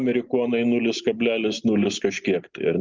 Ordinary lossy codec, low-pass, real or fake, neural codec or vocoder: Opus, 24 kbps; 7.2 kHz; real; none